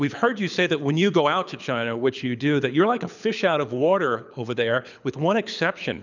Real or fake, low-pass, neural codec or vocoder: fake; 7.2 kHz; codec, 24 kHz, 6 kbps, HILCodec